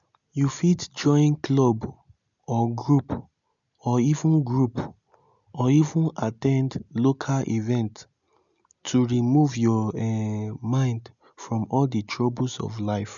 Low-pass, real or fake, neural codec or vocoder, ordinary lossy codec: 7.2 kHz; real; none; none